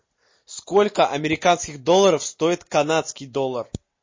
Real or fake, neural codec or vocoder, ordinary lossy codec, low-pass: real; none; MP3, 32 kbps; 7.2 kHz